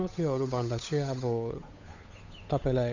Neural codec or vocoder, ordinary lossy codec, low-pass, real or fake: codec, 16 kHz, 8 kbps, FunCodec, trained on Chinese and English, 25 frames a second; none; 7.2 kHz; fake